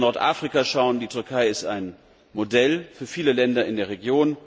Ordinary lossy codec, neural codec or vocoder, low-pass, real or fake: none; none; none; real